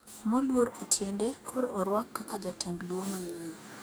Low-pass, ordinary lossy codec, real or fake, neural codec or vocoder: none; none; fake; codec, 44.1 kHz, 2.6 kbps, DAC